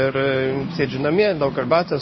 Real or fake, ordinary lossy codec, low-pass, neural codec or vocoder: fake; MP3, 24 kbps; 7.2 kHz; codec, 16 kHz in and 24 kHz out, 1 kbps, XY-Tokenizer